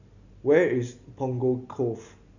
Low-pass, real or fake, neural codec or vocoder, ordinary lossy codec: 7.2 kHz; real; none; none